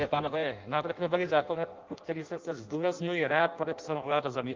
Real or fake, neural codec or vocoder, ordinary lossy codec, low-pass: fake; codec, 16 kHz in and 24 kHz out, 0.6 kbps, FireRedTTS-2 codec; Opus, 24 kbps; 7.2 kHz